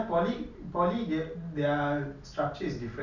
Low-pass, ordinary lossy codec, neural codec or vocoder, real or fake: 7.2 kHz; none; none; real